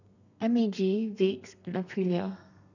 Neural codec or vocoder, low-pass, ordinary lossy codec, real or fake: codec, 32 kHz, 1.9 kbps, SNAC; 7.2 kHz; none; fake